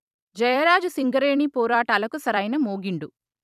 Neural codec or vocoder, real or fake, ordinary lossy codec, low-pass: vocoder, 44.1 kHz, 128 mel bands every 256 samples, BigVGAN v2; fake; none; 14.4 kHz